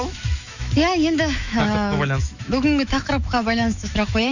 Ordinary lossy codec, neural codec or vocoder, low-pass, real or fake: none; autoencoder, 48 kHz, 128 numbers a frame, DAC-VAE, trained on Japanese speech; 7.2 kHz; fake